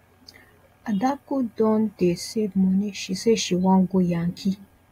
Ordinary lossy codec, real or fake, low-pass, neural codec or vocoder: AAC, 48 kbps; real; 19.8 kHz; none